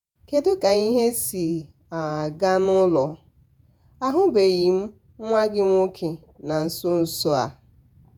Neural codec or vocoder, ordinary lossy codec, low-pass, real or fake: vocoder, 48 kHz, 128 mel bands, Vocos; none; none; fake